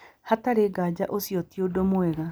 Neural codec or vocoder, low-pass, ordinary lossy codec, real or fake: none; none; none; real